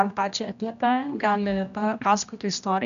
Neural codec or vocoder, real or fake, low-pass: codec, 16 kHz, 1 kbps, X-Codec, HuBERT features, trained on general audio; fake; 7.2 kHz